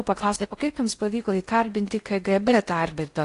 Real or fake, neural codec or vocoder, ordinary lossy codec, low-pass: fake; codec, 16 kHz in and 24 kHz out, 0.6 kbps, FocalCodec, streaming, 4096 codes; AAC, 48 kbps; 10.8 kHz